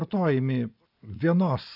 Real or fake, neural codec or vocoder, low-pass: real; none; 5.4 kHz